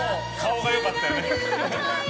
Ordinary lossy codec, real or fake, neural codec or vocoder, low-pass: none; real; none; none